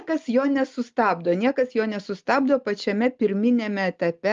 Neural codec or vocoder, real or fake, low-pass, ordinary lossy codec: none; real; 7.2 kHz; Opus, 32 kbps